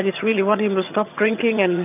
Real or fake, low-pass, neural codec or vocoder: fake; 3.6 kHz; vocoder, 22.05 kHz, 80 mel bands, HiFi-GAN